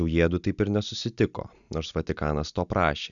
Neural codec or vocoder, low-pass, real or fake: none; 7.2 kHz; real